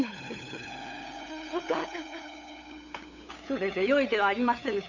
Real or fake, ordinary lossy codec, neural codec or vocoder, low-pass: fake; none; codec, 16 kHz, 8 kbps, FunCodec, trained on LibriTTS, 25 frames a second; 7.2 kHz